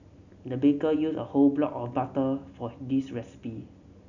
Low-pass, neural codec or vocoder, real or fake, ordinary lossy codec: 7.2 kHz; none; real; none